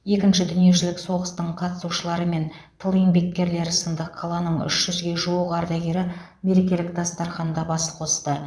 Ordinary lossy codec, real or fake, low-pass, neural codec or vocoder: none; fake; none; vocoder, 22.05 kHz, 80 mel bands, Vocos